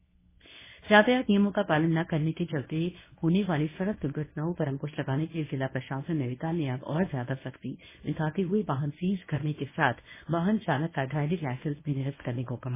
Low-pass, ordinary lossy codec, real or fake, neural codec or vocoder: 3.6 kHz; MP3, 16 kbps; fake; codec, 24 kHz, 0.9 kbps, WavTokenizer, medium speech release version 1